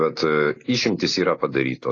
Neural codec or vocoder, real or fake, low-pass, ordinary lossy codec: none; real; 7.2 kHz; AAC, 32 kbps